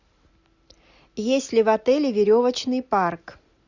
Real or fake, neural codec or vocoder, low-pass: real; none; 7.2 kHz